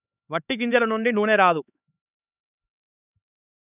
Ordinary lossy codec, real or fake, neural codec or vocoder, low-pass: none; fake; codec, 16 kHz, 4 kbps, X-Codec, HuBERT features, trained on LibriSpeech; 3.6 kHz